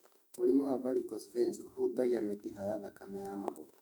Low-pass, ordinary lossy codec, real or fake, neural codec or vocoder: 19.8 kHz; none; fake; autoencoder, 48 kHz, 32 numbers a frame, DAC-VAE, trained on Japanese speech